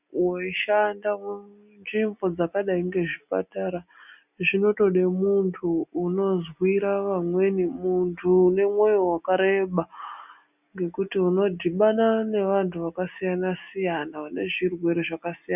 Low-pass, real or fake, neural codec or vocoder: 3.6 kHz; real; none